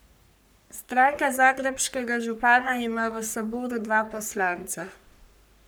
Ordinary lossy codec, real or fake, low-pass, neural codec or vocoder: none; fake; none; codec, 44.1 kHz, 3.4 kbps, Pupu-Codec